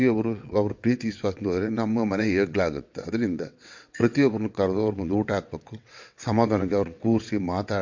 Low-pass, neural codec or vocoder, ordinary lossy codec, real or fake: 7.2 kHz; vocoder, 22.05 kHz, 80 mel bands, WaveNeXt; MP3, 48 kbps; fake